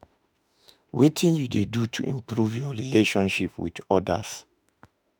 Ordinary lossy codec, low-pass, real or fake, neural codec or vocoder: none; none; fake; autoencoder, 48 kHz, 32 numbers a frame, DAC-VAE, trained on Japanese speech